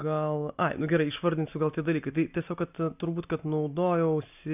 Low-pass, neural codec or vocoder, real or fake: 3.6 kHz; none; real